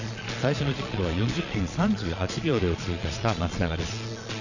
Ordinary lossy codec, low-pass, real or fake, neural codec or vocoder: none; 7.2 kHz; fake; vocoder, 22.05 kHz, 80 mel bands, Vocos